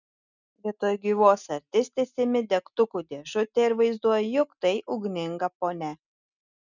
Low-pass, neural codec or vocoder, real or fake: 7.2 kHz; none; real